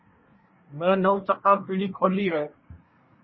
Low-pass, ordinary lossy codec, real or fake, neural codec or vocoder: 7.2 kHz; MP3, 24 kbps; fake; codec, 24 kHz, 1 kbps, SNAC